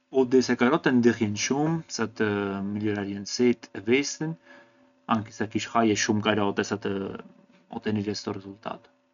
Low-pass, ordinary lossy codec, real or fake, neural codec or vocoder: 7.2 kHz; none; real; none